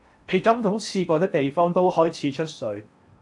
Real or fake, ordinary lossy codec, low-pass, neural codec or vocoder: fake; AAC, 64 kbps; 10.8 kHz; codec, 16 kHz in and 24 kHz out, 0.8 kbps, FocalCodec, streaming, 65536 codes